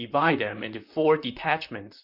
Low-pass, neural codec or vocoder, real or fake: 5.4 kHz; vocoder, 44.1 kHz, 128 mel bands, Pupu-Vocoder; fake